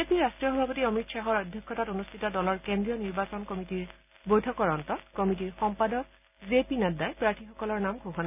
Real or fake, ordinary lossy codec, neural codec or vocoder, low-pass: real; none; none; 3.6 kHz